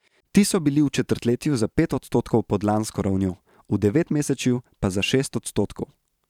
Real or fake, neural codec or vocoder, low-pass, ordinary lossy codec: fake; vocoder, 44.1 kHz, 128 mel bands every 512 samples, BigVGAN v2; 19.8 kHz; none